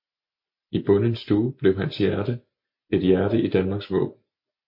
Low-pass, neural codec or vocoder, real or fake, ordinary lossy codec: 5.4 kHz; none; real; MP3, 32 kbps